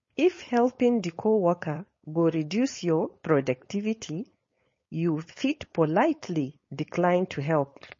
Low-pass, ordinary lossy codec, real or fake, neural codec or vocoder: 7.2 kHz; MP3, 32 kbps; fake; codec, 16 kHz, 4.8 kbps, FACodec